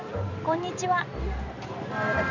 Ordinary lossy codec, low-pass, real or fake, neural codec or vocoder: none; 7.2 kHz; fake; vocoder, 44.1 kHz, 128 mel bands every 512 samples, BigVGAN v2